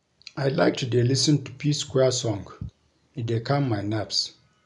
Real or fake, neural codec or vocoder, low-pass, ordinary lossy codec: real; none; 10.8 kHz; none